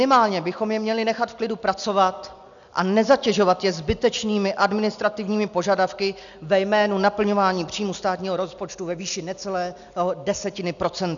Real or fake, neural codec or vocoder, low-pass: real; none; 7.2 kHz